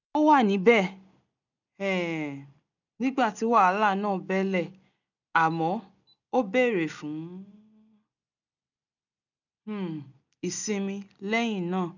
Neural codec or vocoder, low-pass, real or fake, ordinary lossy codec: none; 7.2 kHz; real; none